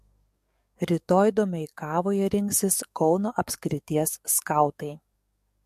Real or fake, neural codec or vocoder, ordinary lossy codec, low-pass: fake; codec, 44.1 kHz, 7.8 kbps, DAC; MP3, 64 kbps; 14.4 kHz